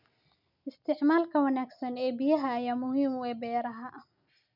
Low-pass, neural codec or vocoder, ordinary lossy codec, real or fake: 5.4 kHz; none; none; real